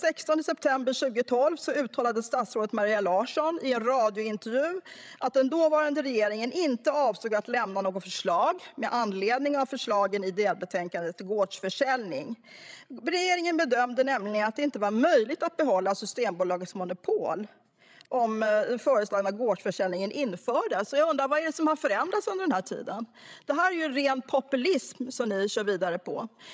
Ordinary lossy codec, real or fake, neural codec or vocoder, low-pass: none; fake; codec, 16 kHz, 16 kbps, FreqCodec, larger model; none